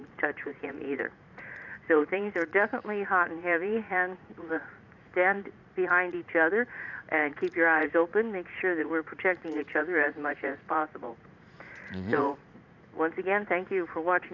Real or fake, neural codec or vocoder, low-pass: fake; vocoder, 44.1 kHz, 80 mel bands, Vocos; 7.2 kHz